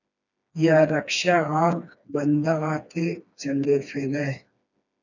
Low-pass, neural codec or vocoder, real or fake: 7.2 kHz; codec, 16 kHz, 2 kbps, FreqCodec, smaller model; fake